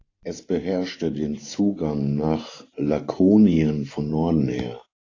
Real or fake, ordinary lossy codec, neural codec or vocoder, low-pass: fake; AAC, 32 kbps; vocoder, 44.1 kHz, 128 mel bands every 512 samples, BigVGAN v2; 7.2 kHz